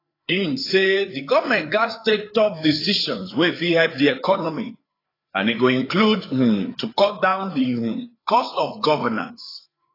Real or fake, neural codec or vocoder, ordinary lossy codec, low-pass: fake; codec, 16 kHz, 4 kbps, FreqCodec, larger model; AAC, 24 kbps; 5.4 kHz